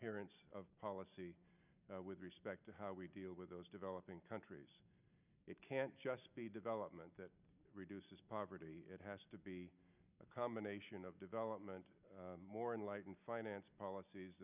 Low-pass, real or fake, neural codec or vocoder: 3.6 kHz; real; none